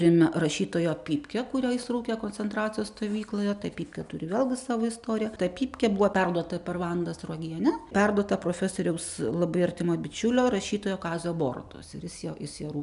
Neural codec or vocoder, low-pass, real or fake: none; 10.8 kHz; real